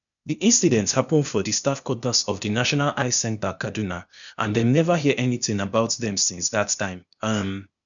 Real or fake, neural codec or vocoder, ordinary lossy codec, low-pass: fake; codec, 16 kHz, 0.8 kbps, ZipCodec; none; 7.2 kHz